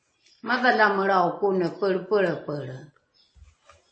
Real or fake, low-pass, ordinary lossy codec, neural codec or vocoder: real; 9.9 kHz; MP3, 32 kbps; none